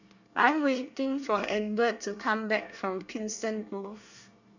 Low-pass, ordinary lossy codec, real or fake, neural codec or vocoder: 7.2 kHz; none; fake; codec, 24 kHz, 1 kbps, SNAC